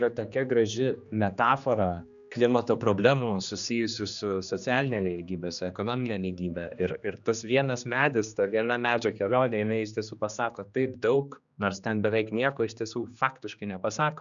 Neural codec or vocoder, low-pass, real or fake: codec, 16 kHz, 2 kbps, X-Codec, HuBERT features, trained on general audio; 7.2 kHz; fake